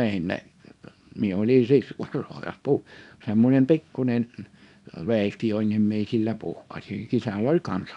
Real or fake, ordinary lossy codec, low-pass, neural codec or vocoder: fake; none; 10.8 kHz; codec, 24 kHz, 0.9 kbps, WavTokenizer, small release